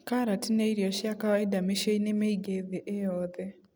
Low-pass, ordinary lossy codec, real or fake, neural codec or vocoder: none; none; real; none